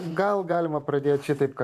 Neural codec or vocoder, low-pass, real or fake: vocoder, 44.1 kHz, 128 mel bands every 512 samples, BigVGAN v2; 14.4 kHz; fake